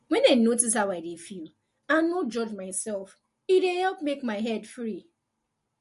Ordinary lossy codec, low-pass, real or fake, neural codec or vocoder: MP3, 48 kbps; 14.4 kHz; real; none